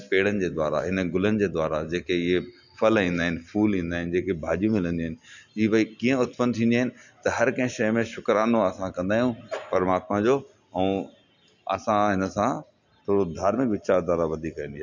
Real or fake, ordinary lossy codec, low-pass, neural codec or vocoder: real; none; 7.2 kHz; none